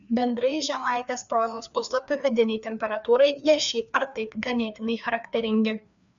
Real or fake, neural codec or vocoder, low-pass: fake; codec, 16 kHz, 2 kbps, FreqCodec, larger model; 7.2 kHz